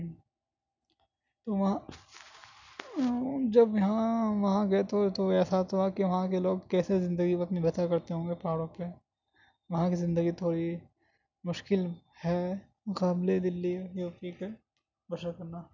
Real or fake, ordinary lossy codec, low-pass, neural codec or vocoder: real; none; 7.2 kHz; none